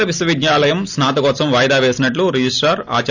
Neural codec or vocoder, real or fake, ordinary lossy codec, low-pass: none; real; none; 7.2 kHz